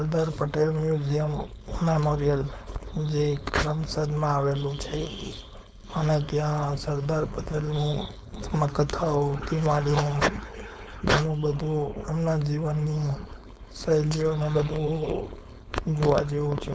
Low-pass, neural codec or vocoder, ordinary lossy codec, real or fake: none; codec, 16 kHz, 4.8 kbps, FACodec; none; fake